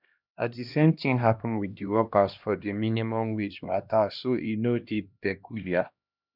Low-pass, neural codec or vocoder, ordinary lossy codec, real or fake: 5.4 kHz; codec, 16 kHz, 1 kbps, X-Codec, HuBERT features, trained on LibriSpeech; none; fake